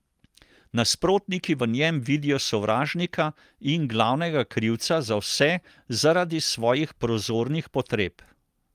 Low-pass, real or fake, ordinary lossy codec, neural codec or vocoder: 14.4 kHz; real; Opus, 32 kbps; none